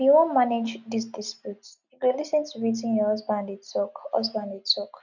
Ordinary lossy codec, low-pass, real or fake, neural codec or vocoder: none; 7.2 kHz; real; none